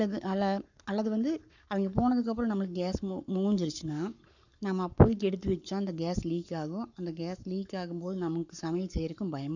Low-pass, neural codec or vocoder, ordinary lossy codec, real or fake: 7.2 kHz; codec, 44.1 kHz, 7.8 kbps, Pupu-Codec; none; fake